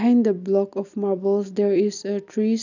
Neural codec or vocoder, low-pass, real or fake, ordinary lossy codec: none; 7.2 kHz; real; none